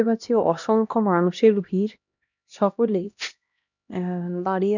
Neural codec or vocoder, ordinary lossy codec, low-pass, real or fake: codec, 16 kHz, 1 kbps, X-Codec, HuBERT features, trained on LibriSpeech; none; 7.2 kHz; fake